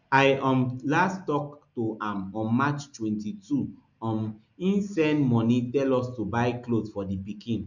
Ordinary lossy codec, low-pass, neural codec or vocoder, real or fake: none; 7.2 kHz; none; real